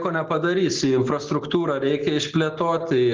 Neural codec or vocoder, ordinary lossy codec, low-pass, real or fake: none; Opus, 16 kbps; 7.2 kHz; real